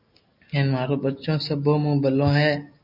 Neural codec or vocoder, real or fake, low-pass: none; real; 5.4 kHz